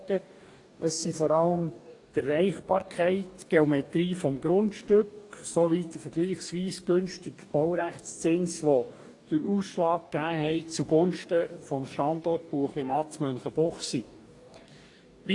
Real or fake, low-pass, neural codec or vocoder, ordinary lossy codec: fake; 10.8 kHz; codec, 44.1 kHz, 2.6 kbps, DAC; AAC, 48 kbps